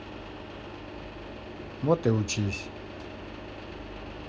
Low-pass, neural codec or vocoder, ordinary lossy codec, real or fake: none; none; none; real